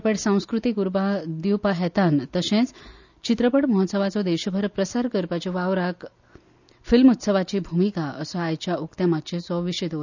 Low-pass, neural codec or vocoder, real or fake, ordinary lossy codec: 7.2 kHz; none; real; none